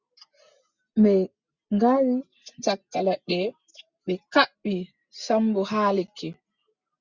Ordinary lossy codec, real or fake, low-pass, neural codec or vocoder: Opus, 64 kbps; real; 7.2 kHz; none